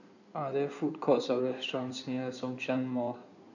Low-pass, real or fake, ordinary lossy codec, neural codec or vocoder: 7.2 kHz; fake; none; codec, 16 kHz in and 24 kHz out, 2.2 kbps, FireRedTTS-2 codec